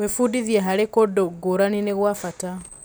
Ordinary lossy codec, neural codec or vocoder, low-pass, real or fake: none; none; none; real